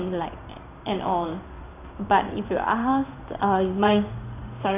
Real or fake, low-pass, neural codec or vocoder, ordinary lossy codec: fake; 3.6 kHz; codec, 16 kHz in and 24 kHz out, 1 kbps, XY-Tokenizer; none